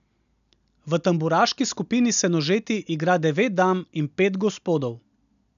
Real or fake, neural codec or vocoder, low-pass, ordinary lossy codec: real; none; 7.2 kHz; none